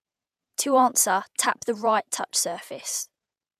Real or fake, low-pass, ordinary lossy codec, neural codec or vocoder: fake; 14.4 kHz; none; vocoder, 48 kHz, 128 mel bands, Vocos